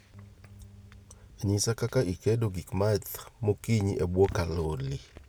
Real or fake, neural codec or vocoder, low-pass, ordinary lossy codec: real; none; none; none